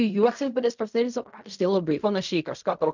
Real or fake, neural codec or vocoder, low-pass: fake; codec, 16 kHz in and 24 kHz out, 0.4 kbps, LongCat-Audio-Codec, fine tuned four codebook decoder; 7.2 kHz